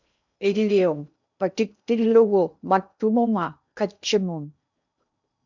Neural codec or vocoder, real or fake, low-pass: codec, 16 kHz in and 24 kHz out, 0.8 kbps, FocalCodec, streaming, 65536 codes; fake; 7.2 kHz